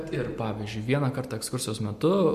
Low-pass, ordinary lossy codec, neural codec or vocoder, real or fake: 14.4 kHz; MP3, 64 kbps; none; real